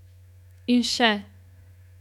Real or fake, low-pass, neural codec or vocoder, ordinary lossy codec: fake; 19.8 kHz; autoencoder, 48 kHz, 128 numbers a frame, DAC-VAE, trained on Japanese speech; none